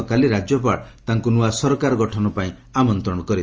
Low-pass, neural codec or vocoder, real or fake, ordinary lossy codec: 7.2 kHz; none; real; Opus, 24 kbps